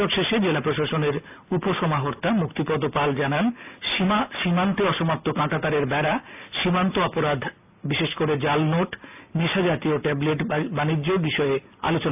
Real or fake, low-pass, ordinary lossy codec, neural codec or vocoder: real; 3.6 kHz; AAC, 32 kbps; none